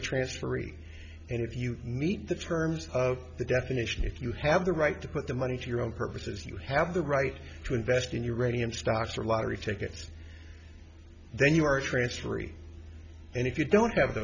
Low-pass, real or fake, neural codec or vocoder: 7.2 kHz; real; none